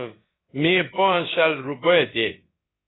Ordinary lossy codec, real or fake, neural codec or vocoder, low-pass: AAC, 16 kbps; fake; codec, 16 kHz, about 1 kbps, DyCAST, with the encoder's durations; 7.2 kHz